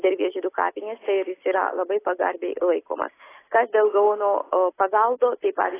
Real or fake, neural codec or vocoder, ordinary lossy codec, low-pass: real; none; AAC, 16 kbps; 3.6 kHz